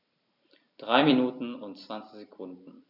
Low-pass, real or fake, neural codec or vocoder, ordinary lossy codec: 5.4 kHz; fake; vocoder, 44.1 kHz, 128 mel bands every 256 samples, BigVGAN v2; none